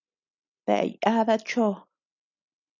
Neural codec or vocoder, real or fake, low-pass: none; real; 7.2 kHz